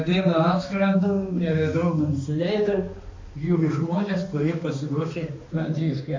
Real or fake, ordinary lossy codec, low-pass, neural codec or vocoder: fake; MP3, 48 kbps; 7.2 kHz; codec, 16 kHz, 2 kbps, X-Codec, HuBERT features, trained on balanced general audio